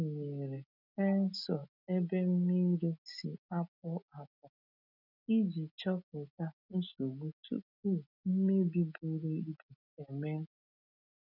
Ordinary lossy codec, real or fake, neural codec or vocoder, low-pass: none; real; none; 5.4 kHz